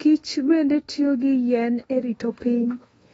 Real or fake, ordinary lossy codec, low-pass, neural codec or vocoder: fake; AAC, 24 kbps; 10.8 kHz; codec, 24 kHz, 1.2 kbps, DualCodec